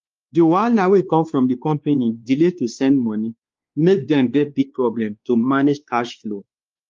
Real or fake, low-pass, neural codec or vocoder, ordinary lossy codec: fake; 7.2 kHz; codec, 16 kHz, 2 kbps, X-Codec, WavLM features, trained on Multilingual LibriSpeech; Opus, 32 kbps